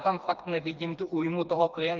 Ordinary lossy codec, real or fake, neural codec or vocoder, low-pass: Opus, 24 kbps; fake; codec, 16 kHz, 2 kbps, FreqCodec, smaller model; 7.2 kHz